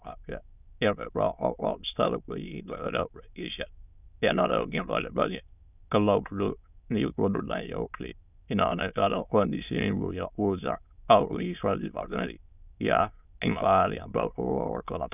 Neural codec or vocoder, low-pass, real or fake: autoencoder, 22.05 kHz, a latent of 192 numbers a frame, VITS, trained on many speakers; 3.6 kHz; fake